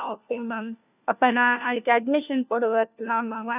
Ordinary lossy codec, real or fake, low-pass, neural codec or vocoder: none; fake; 3.6 kHz; codec, 16 kHz, 1 kbps, FunCodec, trained on LibriTTS, 50 frames a second